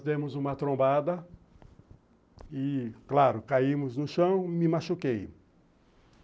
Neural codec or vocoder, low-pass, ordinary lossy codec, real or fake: none; none; none; real